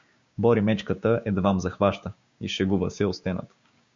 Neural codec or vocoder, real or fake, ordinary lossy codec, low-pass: codec, 16 kHz, 6 kbps, DAC; fake; MP3, 48 kbps; 7.2 kHz